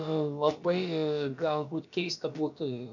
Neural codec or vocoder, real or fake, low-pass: codec, 16 kHz, about 1 kbps, DyCAST, with the encoder's durations; fake; 7.2 kHz